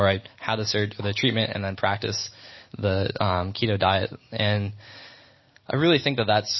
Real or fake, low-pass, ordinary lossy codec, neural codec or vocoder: real; 7.2 kHz; MP3, 24 kbps; none